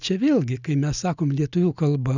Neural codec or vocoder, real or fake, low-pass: none; real; 7.2 kHz